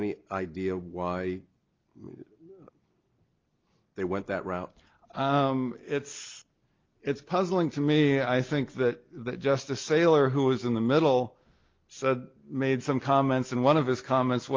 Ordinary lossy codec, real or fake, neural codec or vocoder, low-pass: Opus, 32 kbps; real; none; 7.2 kHz